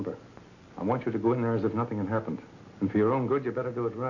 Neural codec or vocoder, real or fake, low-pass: none; real; 7.2 kHz